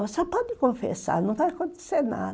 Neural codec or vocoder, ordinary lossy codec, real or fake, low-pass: none; none; real; none